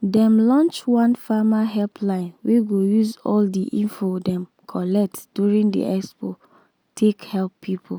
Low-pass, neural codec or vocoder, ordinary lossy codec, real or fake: none; none; none; real